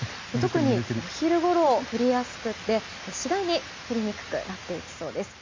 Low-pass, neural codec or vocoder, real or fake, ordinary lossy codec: 7.2 kHz; none; real; MP3, 48 kbps